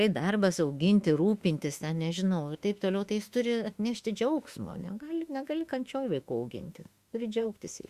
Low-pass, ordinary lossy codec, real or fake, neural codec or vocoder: 14.4 kHz; Opus, 64 kbps; fake; autoencoder, 48 kHz, 32 numbers a frame, DAC-VAE, trained on Japanese speech